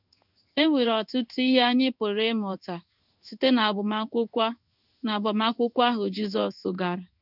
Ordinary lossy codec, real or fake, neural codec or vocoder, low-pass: none; fake; codec, 16 kHz in and 24 kHz out, 1 kbps, XY-Tokenizer; 5.4 kHz